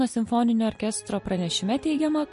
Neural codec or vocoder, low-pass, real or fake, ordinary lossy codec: none; 10.8 kHz; real; MP3, 48 kbps